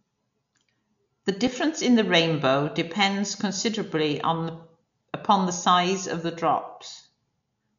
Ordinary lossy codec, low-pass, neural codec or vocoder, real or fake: MP3, 64 kbps; 7.2 kHz; none; real